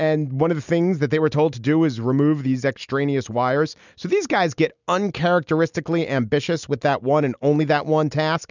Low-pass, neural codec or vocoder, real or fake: 7.2 kHz; none; real